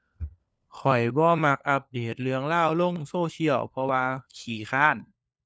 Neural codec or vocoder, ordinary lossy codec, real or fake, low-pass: codec, 16 kHz, 4 kbps, FunCodec, trained on LibriTTS, 50 frames a second; none; fake; none